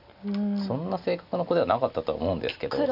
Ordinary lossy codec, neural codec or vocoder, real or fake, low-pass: none; none; real; 5.4 kHz